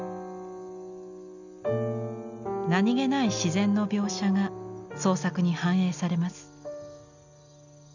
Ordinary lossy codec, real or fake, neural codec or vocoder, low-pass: none; real; none; 7.2 kHz